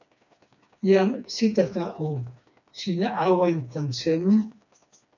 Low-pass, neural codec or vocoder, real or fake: 7.2 kHz; codec, 16 kHz, 2 kbps, FreqCodec, smaller model; fake